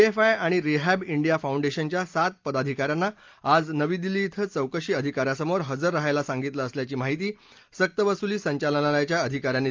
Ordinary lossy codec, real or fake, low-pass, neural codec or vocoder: Opus, 32 kbps; real; 7.2 kHz; none